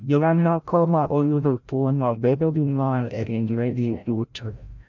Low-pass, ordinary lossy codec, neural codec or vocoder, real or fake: 7.2 kHz; none; codec, 16 kHz, 0.5 kbps, FreqCodec, larger model; fake